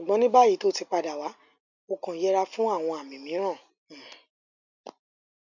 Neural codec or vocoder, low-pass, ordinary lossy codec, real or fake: none; 7.2 kHz; none; real